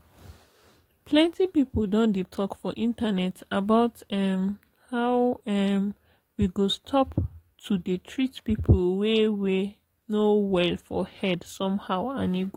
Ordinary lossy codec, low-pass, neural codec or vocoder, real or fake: AAC, 48 kbps; 19.8 kHz; codec, 44.1 kHz, 7.8 kbps, DAC; fake